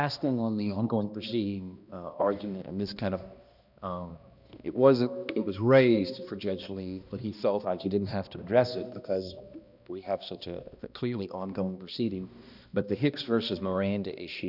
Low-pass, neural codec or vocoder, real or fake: 5.4 kHz; codec, 16 kHz, 1 kbps, X-Codec, HuBERT features, trained on balanced general audio; fake